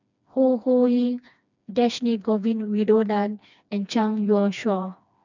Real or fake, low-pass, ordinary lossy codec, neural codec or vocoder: fake; 7.2 kHz; none; codec, 16 kHz, 2 kbps, FreqCodec, smaller model